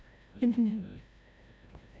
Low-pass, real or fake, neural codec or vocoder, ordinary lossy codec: none; fake; codec, 16 kHz, 0.5 kbps, FreqCodec, larger model; none